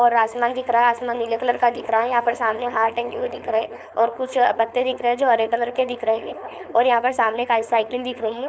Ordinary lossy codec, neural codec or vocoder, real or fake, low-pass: none; codec, 16 kHz, 4.8 kbps, FACodec; fake; none